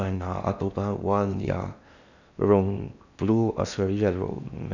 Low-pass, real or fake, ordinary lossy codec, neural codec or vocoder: 7.2 kHz; fake; none; codec, 16 kHz in and 24 kHz out, 0.6 kbps, FocalCodec, streaming, 4096 codes